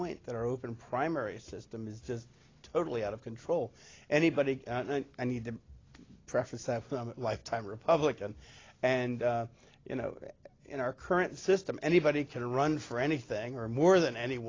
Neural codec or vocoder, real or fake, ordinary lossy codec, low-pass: none; real; AAC, 32 kbps; 7.2 kHz